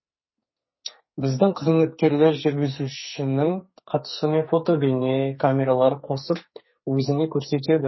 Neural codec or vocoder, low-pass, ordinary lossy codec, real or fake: codec, 44.1 kHz, 2.6 kbps, SNAC; 7.2 kHz; MP3, 24 kbps; fake